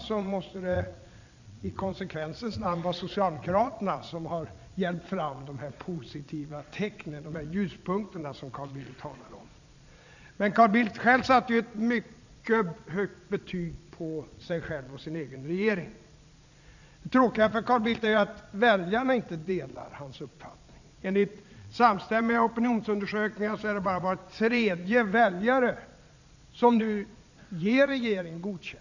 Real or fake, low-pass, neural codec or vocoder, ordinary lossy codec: fake; 7.2 kHz; vocoder, 22.05 kHz, 80 mel bands, Vocos; none